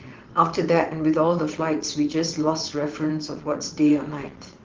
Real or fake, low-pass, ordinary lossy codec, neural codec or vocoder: fake; 7.2 kHz; Opus, 16 kbps; vocoder, 22.05 kHz, 80 mel bands, WaveNeXt